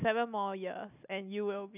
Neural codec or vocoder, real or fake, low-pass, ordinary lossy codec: none; real; 3.6 kHz; none